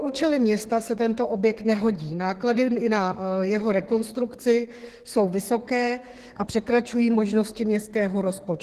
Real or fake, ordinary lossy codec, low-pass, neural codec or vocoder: fake; Opus, 16 kbps; 14.4 kHz; codec, 32 kHz, 1.9 kbps, SNAC